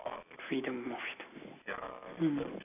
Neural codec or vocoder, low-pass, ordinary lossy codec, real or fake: none; 3.6 kHz; none; real